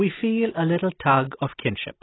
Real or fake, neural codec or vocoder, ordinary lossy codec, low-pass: real; none; AAC, 16 kbps; 7.2 kHz